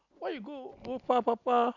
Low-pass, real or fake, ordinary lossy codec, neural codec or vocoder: 7.2 kHz; real; none; none